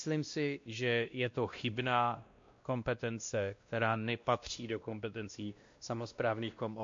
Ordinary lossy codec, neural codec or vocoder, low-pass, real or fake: MP3, 48 kbps; codec, 16 kHz, 1 kbps, X-Codec, WavLM features, trained on Multilingual LibriSpeech; 7.2 kHz; fake